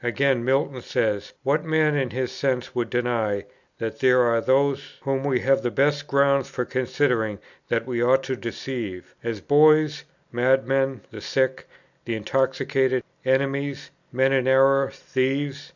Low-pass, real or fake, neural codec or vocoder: 7.2 kHz; real; none